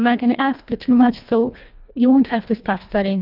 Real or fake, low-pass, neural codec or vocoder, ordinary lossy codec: fake; 5.4 kHz; codec, 24 kHz, 1.5 kbps, HILCodec; Opus, 24 kbps